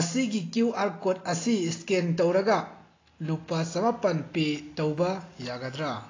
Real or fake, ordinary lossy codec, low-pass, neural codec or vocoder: real; AAC, 32 kbps; 7.2 kHz; none